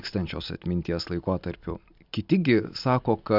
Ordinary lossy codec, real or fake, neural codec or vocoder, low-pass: AAC, 48 kbps; real; none; 5.4 kHz